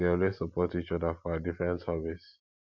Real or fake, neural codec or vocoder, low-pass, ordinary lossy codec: real; none; 7.2 kHz; none